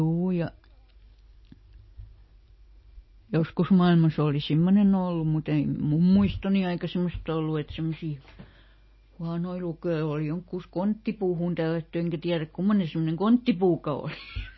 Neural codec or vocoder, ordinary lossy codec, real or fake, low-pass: none; MP3, 24 kbps; real; 7.2 kHz